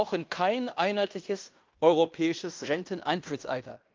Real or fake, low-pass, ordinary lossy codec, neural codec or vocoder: fake; 7.2 kHz; Opus, 24 kbps; codec, 16 kHz in and 24 kHz out, 0.9 kbps, LongCat-Audio-Codec, fine tuned four codebook decoder